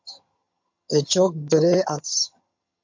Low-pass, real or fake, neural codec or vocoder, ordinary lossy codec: 7.2 kHz; fake; vocoder, 22.05 kHz, 80 mel bands, HiFi-GAN; MP3, 48 kbps